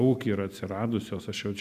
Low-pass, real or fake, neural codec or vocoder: 14.4 kHz; real; none